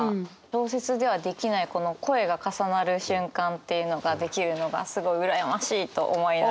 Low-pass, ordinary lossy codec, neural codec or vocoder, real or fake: none; none; none; real